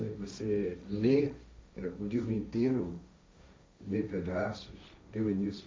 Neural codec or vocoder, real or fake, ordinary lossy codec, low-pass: codec, 16 kHz, 1.1 kbps, Voila-Tokenizer; fake; none; none